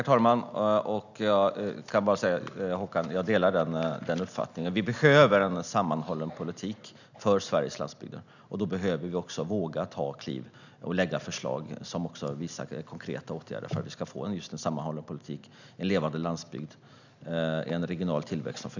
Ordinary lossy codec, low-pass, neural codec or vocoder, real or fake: none; 7.2 kHz; none; real